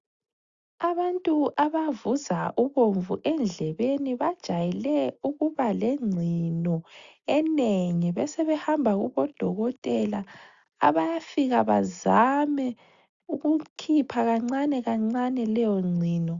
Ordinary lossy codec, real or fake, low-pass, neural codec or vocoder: Opus, 64 kbps; real; 7.2 kHz; none